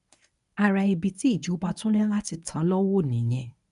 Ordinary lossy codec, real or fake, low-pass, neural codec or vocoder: none; fake; 10.8 kHz; codec, 24 kHz, 0.9 kbps, WavTokenizer, medium speech release version 1